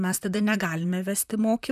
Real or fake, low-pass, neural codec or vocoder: fake; 14.4 kHz; vocoder, 44.1 kHz, 128 mel bands, Pupu-Vocoder